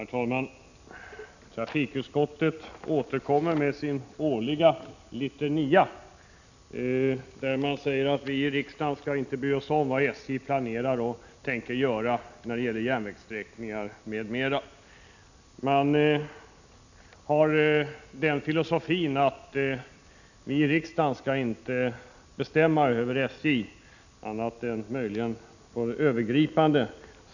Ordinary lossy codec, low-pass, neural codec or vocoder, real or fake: none; 7.2 kHz; none; real